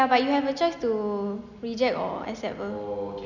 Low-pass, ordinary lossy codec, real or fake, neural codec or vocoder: 7.2 kHz; none; real; none